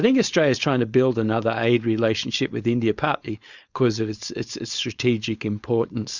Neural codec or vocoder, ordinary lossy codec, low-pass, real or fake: codec, 16 kHz, 4.8 kbps, FACodec; Opus, 64 kbps; 7.2 kHz; fake